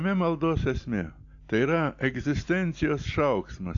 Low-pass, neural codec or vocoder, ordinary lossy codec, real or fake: 7.2 kHz; none; AAC, 64 kbps; real